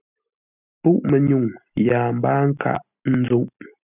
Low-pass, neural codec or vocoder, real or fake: 3.6 kHz; none; real